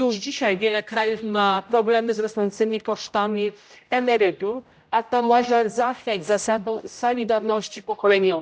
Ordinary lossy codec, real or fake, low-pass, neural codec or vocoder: none; fake; none; codec, 16 kHz, 0.5 kbps, X-Codec, HuBERT features, trained on general audio